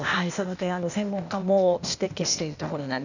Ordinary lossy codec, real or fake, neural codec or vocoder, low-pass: none; fake; codec, 16 kHz, 0.8 kbps, ZipCodec; 7.2 kHz